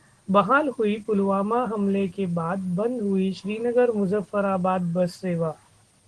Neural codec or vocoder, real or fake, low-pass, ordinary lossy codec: none; real; 10.8 kHz; Opus, 16 kbps